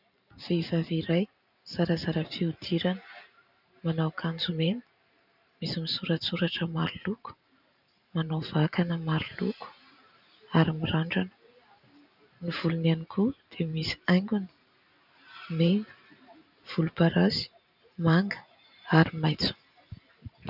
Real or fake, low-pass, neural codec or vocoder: fake; 5.4 kHz; vocoder, 44.1 kHz, 128 mel bands every 512 samples, BigVGAN v2